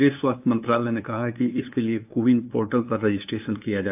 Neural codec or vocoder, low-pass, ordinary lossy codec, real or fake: codec, 16 kHz, 2 kbps, FunCodec, trained on LibriTTS, 25 frames a second; 3.6 kHz; AAC, 32 kbps; fake